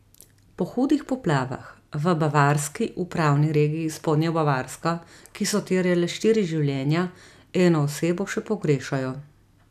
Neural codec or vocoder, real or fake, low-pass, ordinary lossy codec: none; real; 14.4 kHz; none